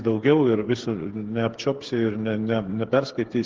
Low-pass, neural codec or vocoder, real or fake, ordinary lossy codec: 7.2 kHz; codec, 16 kHz, 8 kbps, FreqCodec, smaller model; fake; Opus, 16 kbps